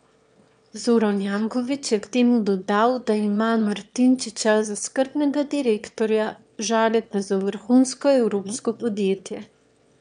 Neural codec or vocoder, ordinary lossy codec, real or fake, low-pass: autoencoder, 22.05 kHz, a latent of 192 numbers a frame, VITS, trained on one speaker; none; fake; 9.9 kHz